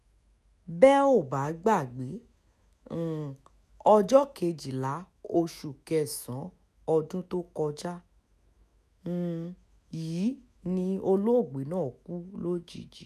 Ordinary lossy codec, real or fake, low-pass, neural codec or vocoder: none; fake; 14.4 kHz; autoencoder, 48 kHz, 128 numbers a frame, DAC-VAE, trained on Japanese speech